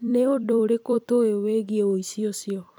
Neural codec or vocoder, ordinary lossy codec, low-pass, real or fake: vocoder, 44.1 kHz, 128 mel bands every 256 samples, BigVGAN v2; none; none; fake